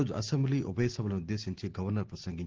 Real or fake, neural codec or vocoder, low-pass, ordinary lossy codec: real; none; 7.2 kHz; Opus, 16 kbps